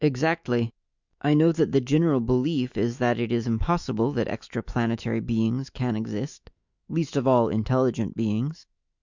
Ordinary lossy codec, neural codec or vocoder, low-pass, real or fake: Opus, 64 kbps; autoencoder, 48 kHz, 128 numbers a frame, DAC-VAE, trained on Japanese speech; 7.2 kHz; fake